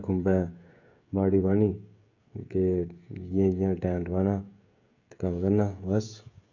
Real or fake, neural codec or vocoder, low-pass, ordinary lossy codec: fake; codec, 16 kHz, 16 kbps, FreqCodec, smaller model; 7.2 kHz; none